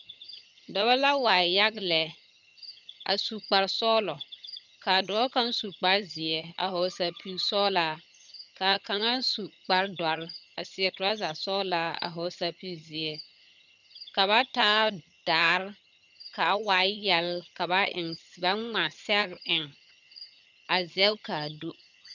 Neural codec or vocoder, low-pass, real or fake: vocoder, 22.05 kHz, 80 mel bands, HiFi-GAN; 7.2 kHz; fake